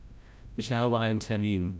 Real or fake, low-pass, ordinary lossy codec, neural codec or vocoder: fake; none; none; codec, 16 kHz, 0.5 kbps, FreqCodec, larger model